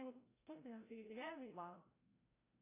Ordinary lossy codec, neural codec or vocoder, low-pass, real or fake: AAC, 16 kbps; codec, 16 kHz, 0.5 kbps, FreqCodec, larger model; 3.6 kHz; fake